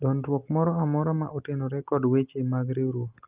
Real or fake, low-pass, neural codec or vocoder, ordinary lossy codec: real; 3.6 kHz; none; Opus, 24 kbps